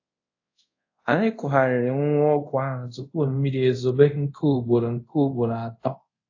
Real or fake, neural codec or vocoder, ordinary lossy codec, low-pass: fake; codec, 24 kHz, 0.5 kbps, DualCodec; AAC, 48 kbps; 7.2 kHz